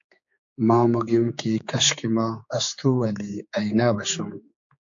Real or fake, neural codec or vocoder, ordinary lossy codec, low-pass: fake; codec, 16 kHz, 4 kbps, X-Codec, HuBERT features, trained on general audio; AAC, 64 kbps; 7.2 kHz